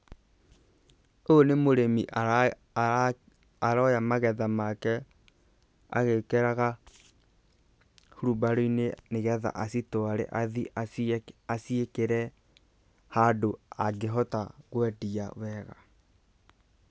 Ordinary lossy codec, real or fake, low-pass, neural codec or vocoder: none; real; none; none